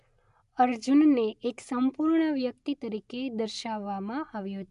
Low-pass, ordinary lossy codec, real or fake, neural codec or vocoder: 10.8 kHz; MP3, 96 kbps; real; none